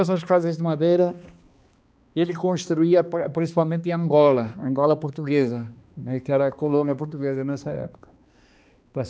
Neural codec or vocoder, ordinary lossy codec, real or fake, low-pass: codec, 16 kHz, 2 kbps, X-Codec, HuBERT features, trained on balanced general audio; none; fake; none